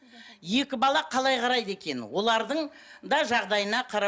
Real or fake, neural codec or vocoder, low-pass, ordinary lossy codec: real; none; none; none